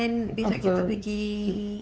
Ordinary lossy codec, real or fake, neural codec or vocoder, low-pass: none; fake; codec, 16 kHz, 4 kbps, X-Codec, WavLM features, trained on Multilingual LibriSpeech; none